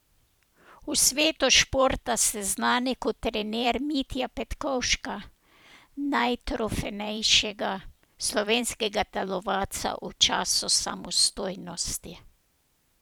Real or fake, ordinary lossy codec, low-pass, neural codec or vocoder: fake; none; none; vocoder, 44.1 kHz, 128 mel bands every 512 samples, BigVGAN v2